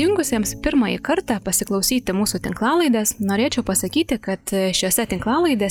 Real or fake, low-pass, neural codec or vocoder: real; 19.8 kHz; none